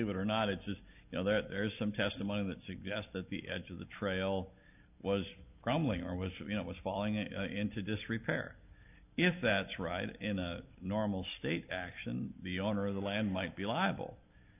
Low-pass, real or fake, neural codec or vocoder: 3.6 kHz; real; none